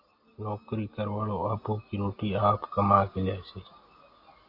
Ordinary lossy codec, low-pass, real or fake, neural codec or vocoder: AAC, 32 kbps; 5.4 kHz; real; none